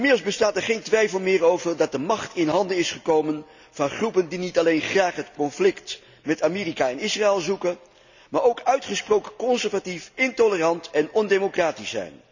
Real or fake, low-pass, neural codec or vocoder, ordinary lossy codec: real; 7.2 kHz; none; none